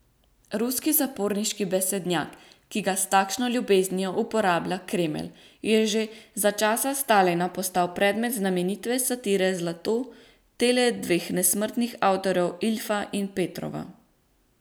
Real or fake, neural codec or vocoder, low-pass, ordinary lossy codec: real; none; none; none